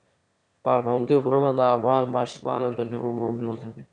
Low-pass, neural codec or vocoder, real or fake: 9.9 kHz; autoencoder, 22.05 kHz, a latent of 192 numbers a frame, VITS, trained on one speaker; fake